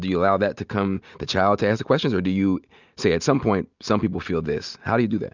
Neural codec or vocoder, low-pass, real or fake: none; 7.2 kHz; real